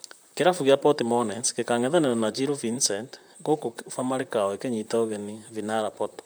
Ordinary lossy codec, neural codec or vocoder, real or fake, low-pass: none; vocoder, 44.1 kHz, 128 mel bands, Pupu-Vocoder; fake; none